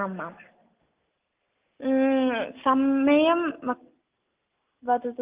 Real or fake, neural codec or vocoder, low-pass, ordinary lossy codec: real; none; 3.6 kHz; Opus, 32 kbps